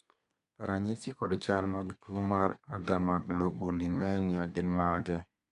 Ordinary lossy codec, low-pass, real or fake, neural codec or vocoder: none; 10.8 kHz; fake; codec, 24 kHz, 1 kbps, SNAC